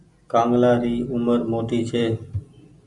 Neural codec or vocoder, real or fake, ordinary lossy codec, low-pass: none; real; Opus, 64 kbps; 10.8 kHz